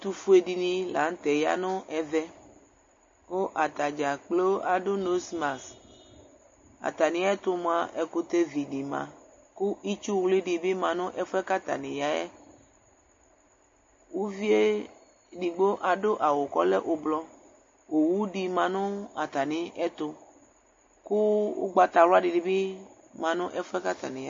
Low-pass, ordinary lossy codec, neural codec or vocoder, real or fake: 7.2 kHz; MP3, 32 kbps; none; real